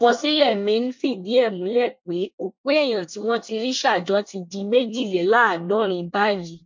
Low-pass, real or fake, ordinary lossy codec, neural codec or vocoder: 7.2 kHz; fake; MP3, 48 kbps; codec, 24 kHz, 1 kbps, SNAC